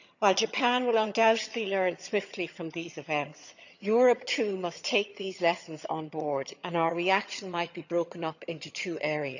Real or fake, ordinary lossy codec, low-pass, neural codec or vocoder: fake; none; 7.2 kHz; vocoder, 22.05 kHz, 80 mel bands, HiFi-GAN